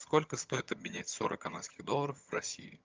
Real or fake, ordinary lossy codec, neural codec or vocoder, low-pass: fake; Opus, 24 kbps; vocoder, 22.05 kHz, 80 mel bands, HiFi-GAN; 7.2 kHz